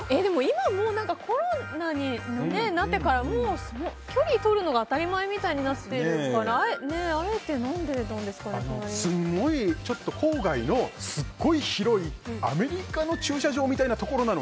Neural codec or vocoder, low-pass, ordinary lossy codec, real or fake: none; none; none; real